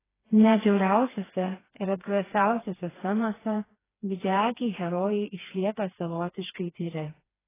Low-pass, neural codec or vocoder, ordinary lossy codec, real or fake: 3.6 kHz; codec, 16 kHz, 2 kbps, FreqCodec, smaller model; AAC, 16 kbps; fake